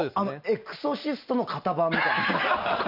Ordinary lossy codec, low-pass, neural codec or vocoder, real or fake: none; 5.4 kHz; none; real